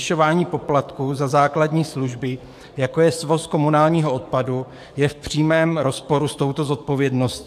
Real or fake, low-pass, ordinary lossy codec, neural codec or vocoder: fake; 14.4 kHz; AAC, 96 kbps; codec, 44.1 kHz, 7.8 kbps, DAC